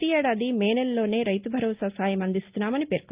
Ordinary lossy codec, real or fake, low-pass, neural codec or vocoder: Opus, 24 kbps; real; 3.6 kHz; none